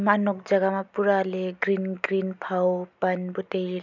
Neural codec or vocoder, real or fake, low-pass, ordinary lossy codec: none; real; 7.2 kHz; none